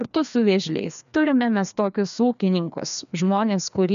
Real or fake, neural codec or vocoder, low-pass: fake; codec, 16 kHz, 2 kbps, FreqCodec, larger model; 7.2 kHz